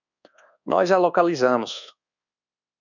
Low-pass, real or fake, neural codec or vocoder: 7.2 kHz; fake; codec, 24 kHz, 1.2 kbps, DualCodec